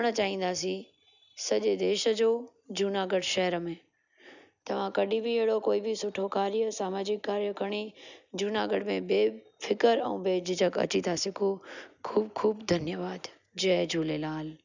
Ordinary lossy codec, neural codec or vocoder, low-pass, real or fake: none; none; 7.2 kHz; real